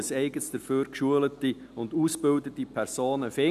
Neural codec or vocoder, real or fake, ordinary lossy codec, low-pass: none; real; none; 14.4 kHz